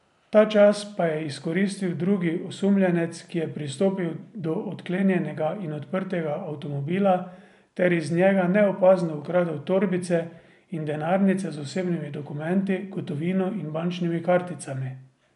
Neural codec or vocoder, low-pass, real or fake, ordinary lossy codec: none; 10.8 kHz; real; none